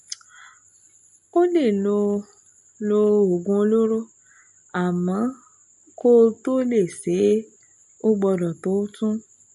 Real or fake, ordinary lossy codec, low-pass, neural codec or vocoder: real; MP3, 48 kbps; 14.4 kHz; none